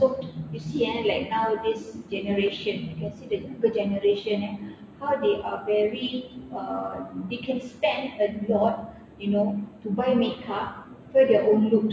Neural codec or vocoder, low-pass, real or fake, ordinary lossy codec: none; none; real; none